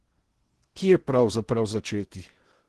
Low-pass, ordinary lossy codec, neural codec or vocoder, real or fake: 10.8 kHz; Opus, 16 kbps; codec, 16 kHz in and 24 kHz out, 0.8 kbps, FocalCodec, streaming, 65536 codes; fake